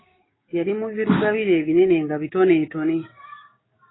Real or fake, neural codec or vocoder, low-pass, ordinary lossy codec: fake; codec, 16 kHz, 6 kbps, DAC; 7.2 kHz; AAC, 16 kbps